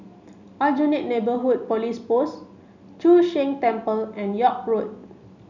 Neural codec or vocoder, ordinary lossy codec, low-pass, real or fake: none; none; 7.2 kHz; real